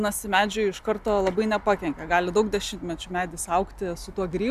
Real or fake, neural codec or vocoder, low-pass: real; none; 14.4 kHz